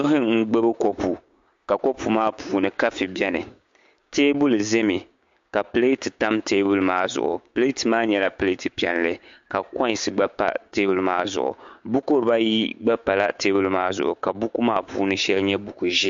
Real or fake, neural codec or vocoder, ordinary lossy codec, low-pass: fake; codec, 16 kHz, 6 kbps, DAC; MP3, 48 kbps; 7.2 kHz